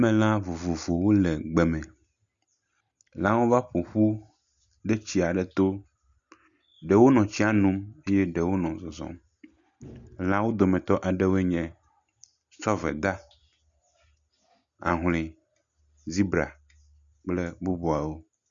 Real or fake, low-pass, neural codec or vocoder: real; 7.2 kHz; none